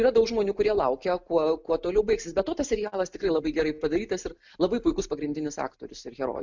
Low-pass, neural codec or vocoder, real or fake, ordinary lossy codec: 7.2 kHz; none; real; MP3, 64 kbps